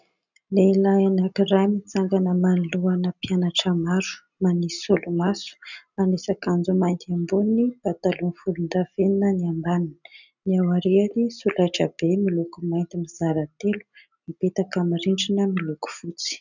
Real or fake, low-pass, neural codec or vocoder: real; 7.2 kHz; none